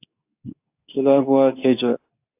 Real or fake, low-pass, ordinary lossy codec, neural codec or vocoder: fake; 3.6 kHz; AAC, 32 kbps; codec, 24 kHz, 0.9 kbps, WavTokenizer, medium speech release version 2